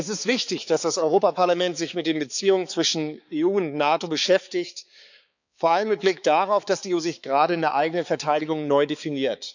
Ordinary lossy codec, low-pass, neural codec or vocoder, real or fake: none; 7.2 kHz; codec, 16 kHz, 4 kbps, X-Codec, HuBERT features, trained on balanced general audio; fake